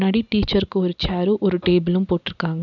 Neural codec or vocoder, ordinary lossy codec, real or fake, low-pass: none; none; real; 7.2 kHz